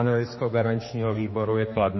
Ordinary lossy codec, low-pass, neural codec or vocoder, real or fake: MP3, 24 kbps; 7.2 kHz; codec, 16 kHz, 2 kbps, X-Codec, HuBERT features, trained on general audio; fake